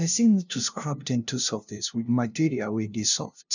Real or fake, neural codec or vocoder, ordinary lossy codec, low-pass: fake; codec, 16 kHz, 0.5 kbps, FunCodec, trained on LibriTTS, 25 frames a second; none; 7.2 kHz